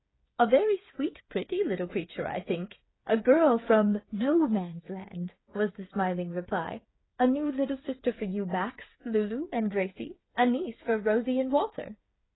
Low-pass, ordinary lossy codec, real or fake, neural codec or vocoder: 7.2 kHz; AAC, 16 kbps; fake; codec, 16 kHz, 8 kbps, FreqCodec, smaller model